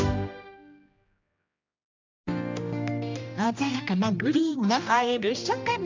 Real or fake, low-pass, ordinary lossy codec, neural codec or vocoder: fake; 7.2 kHz; MP3, 64 kbps; codec, 16 kHz, 1 kbps, X-Codec, HuBERT features, trained on general audio